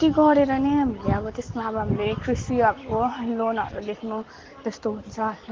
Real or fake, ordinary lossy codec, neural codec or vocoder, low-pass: real; Opus, 16 kbps; none; 7.2 kHz